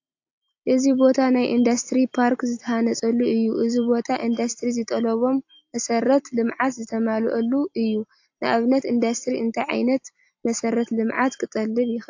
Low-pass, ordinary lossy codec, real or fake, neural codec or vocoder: 7.2 kHz; AAC, 48 kbps; real; none